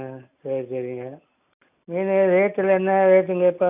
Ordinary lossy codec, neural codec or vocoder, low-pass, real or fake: AAC, 32 kbps; none; 3.6 kHz; real